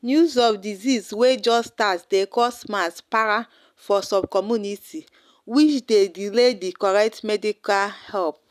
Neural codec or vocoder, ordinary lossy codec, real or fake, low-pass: none; none; real; 14.4 kHz